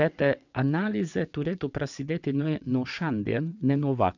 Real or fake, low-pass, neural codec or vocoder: fake; 7.2 kHz; vocoder, 22.05 kHz, 80 mel bands, WaveNeXt